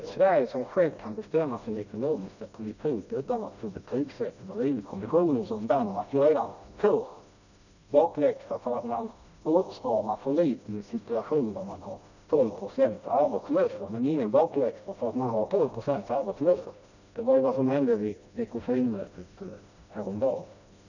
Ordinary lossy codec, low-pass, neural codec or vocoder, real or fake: none; 7.2 kHz; codec, 16 kHz, 1 kbps, FreqCodec, smaller model; fake